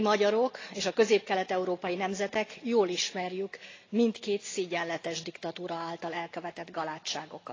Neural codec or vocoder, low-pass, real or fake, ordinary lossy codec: none; 7.2 kHz; real; AAC, 32 kbps